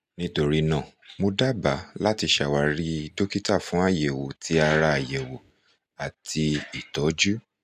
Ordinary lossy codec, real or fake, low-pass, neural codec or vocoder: none; real; 14.4 kHz; none